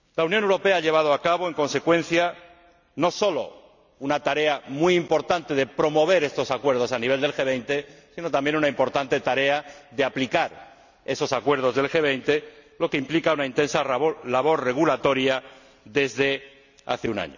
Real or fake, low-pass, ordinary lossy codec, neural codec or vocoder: real; 7.2 kHz; none; none